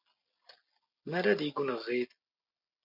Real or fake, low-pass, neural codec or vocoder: real; 5.4 kHz; none